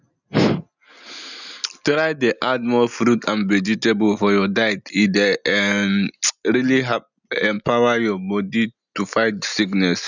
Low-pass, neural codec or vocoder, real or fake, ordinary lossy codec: 7.2 kHz; none; real; none